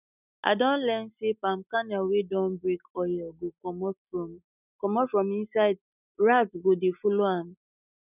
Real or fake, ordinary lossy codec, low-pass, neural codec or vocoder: real; none; 3.6 kHz; none